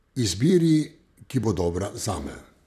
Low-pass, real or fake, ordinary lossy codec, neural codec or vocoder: 14.4 kHz; fake; none; vocoder, 44.1 kHz, 128 mel bands, Pupu-Vocoder